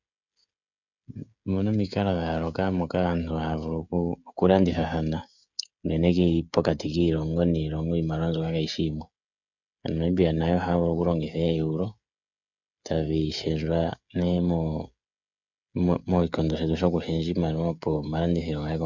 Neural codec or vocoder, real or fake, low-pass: codec, 16 kHz, 16 kbps, FreqCodec, smaller model; fake; 7.2 kHz